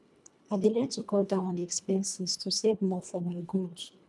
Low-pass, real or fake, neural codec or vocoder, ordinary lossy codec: none; fake; codec, 24 kHz, 1.5 kbps, HILCodec; none